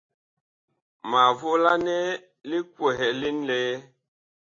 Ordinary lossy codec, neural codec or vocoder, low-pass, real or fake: AAC, 32 kbps; none; 7.2 kHz; real